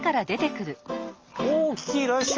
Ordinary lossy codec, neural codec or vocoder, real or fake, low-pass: Opus, 24 kbps; none; real; 7.2 kHz